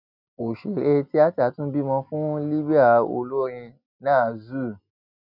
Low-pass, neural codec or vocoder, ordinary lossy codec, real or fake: 5.4 kHz; none; none; real